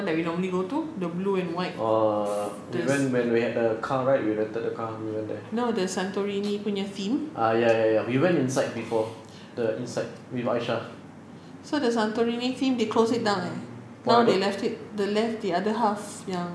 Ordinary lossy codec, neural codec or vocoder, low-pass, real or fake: none; none; none; real